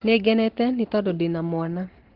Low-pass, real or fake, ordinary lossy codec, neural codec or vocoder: 5.4 kHz; real; Opus, 16 kbps; none